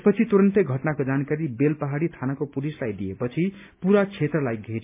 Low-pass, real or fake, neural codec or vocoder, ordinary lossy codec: 3.6 kHz; real; none; MP3, 32 kbps